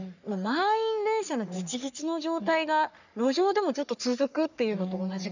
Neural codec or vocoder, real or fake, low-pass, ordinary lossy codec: codec, 44.1 kHz, 3.4 kbps, Pupu-Codec; fake; 7.2 kHz; none